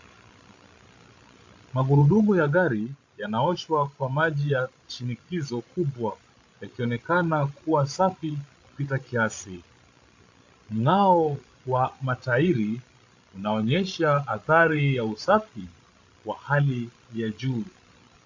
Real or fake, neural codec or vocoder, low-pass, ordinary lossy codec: fake; codec, 16 kHz, 16 kbps, FreqCodec, larger model; 7.2 kHz; AAC, 48 kbps